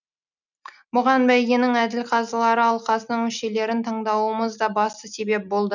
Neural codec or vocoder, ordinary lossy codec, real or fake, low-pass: none; none; real; 7.2 kHz